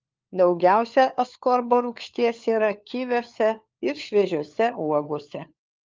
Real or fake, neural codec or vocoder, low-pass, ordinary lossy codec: fake; codec, 16 kHz, 4 kbps, FunCodec, trained on LibriTTS, 50 frames a second; 7.2 kHz; Opus, 24 kbps